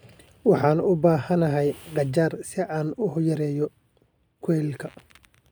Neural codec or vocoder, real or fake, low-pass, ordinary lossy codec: none; real; none; none